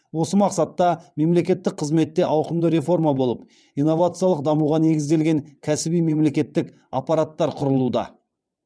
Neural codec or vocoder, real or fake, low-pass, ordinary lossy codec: vocoder, 22.05 kHz, 80 mel bands, WaveNeXt; fake; none; none